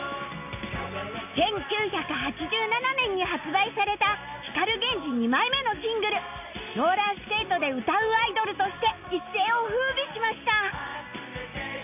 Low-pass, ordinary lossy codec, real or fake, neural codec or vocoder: 3.6 kHz; none; real; none